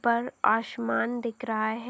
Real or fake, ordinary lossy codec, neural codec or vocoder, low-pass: real; none; none; none